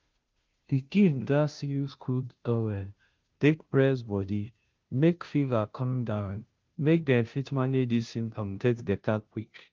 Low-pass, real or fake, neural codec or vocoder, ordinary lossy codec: 7.2 kHz; fake; codec, 16 kHz, 0.5 kbps, FunCodec, trained on Chinese and English, 25 frames a second; Opus, 32 kbps